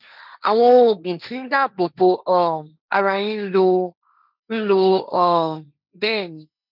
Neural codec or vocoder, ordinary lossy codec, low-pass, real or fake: codec, 16 kHz, 1.1 kbps, Voila-Tokenizer; none; 5.4 kHz; fake